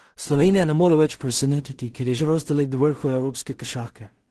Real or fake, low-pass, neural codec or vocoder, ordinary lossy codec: fake; 10.8 kHz; codec, 16 kHz in and 24 kHz out, 0.4 kbps, LongCat-Audio-Codec, two codebook decoder; Opus, 16 kbps